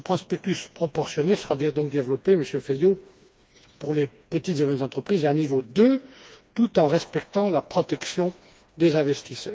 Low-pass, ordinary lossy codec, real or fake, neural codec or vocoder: none; none; fake; codec, 16 kHz, 2 kbps, FreqCodec, smaller model